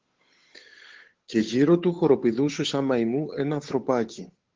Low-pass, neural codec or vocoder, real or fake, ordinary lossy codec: 7.2 kHz; codec, 16 kHz, 8 kbps, FunCodec, trained on Chinese and English, 25 frames a second; fake; Opus, 16 kbps